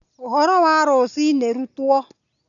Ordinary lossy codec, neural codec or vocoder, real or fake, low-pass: none; none; real; 7.2 kHz